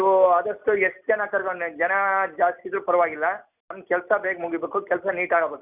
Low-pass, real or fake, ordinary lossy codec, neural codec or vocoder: 3.6 kHz; real; none; none